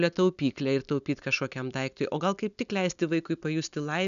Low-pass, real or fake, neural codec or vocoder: 7.2 kHz; real; none